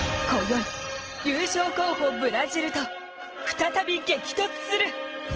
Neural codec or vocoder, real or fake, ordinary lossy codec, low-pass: none; real; Opus, 16 kbps; 7.2 kHz